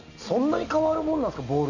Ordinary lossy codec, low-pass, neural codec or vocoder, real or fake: none; 7.2 kHz; none; real